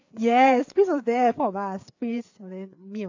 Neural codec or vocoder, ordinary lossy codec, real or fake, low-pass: codec, 16 kHz, 4 kbps, FreqCodec, larger model; AAC, 48 kbps; fake; 7.2 kHz